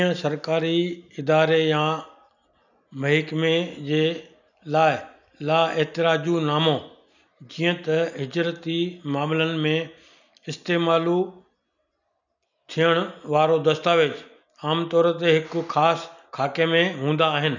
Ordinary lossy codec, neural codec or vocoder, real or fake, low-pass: none; none; real; 7.2 kHz